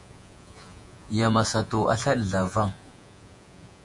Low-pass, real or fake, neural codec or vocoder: 10.8 kHz; fake; vocoder, 48 kHz, 128 mel bands, Vocos